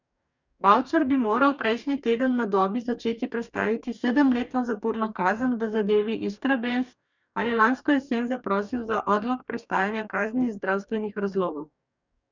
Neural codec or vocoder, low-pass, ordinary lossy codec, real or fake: codec, 44.1 kHz, 2.6 kbps, DAC; 7.2 kHz; none; fake